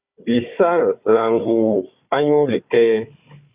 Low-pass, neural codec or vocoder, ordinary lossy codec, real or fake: 3.6 kHz; codec, 16 kHz, 4 kbps, FunCodec, trained on Chinese and English, 50 frames a second; Opus, 64 kbps; fake